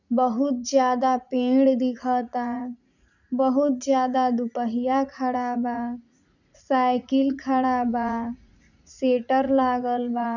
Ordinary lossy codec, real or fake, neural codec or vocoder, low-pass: none; fake; vocoder, 44.1 kHz, 80 mel bands, Vocos; 7.2 kHz